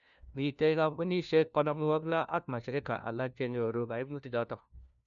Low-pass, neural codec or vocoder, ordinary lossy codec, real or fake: 7.2 kHz; codec, 16 kHz, 1 kbps, FunCodec, trained on LibriTTS, 50 frames a second; none; fake